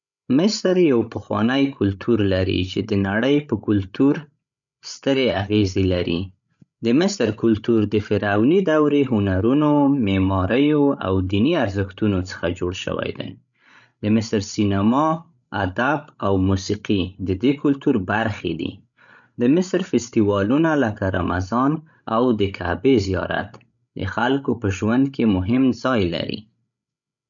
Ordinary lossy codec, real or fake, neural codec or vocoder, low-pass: none; fake; codec, 16 kHz, 16 kbps, FreqCodec, larger model; 7.2 kHz